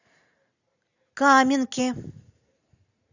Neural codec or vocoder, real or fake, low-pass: none; real; 7.2 kHz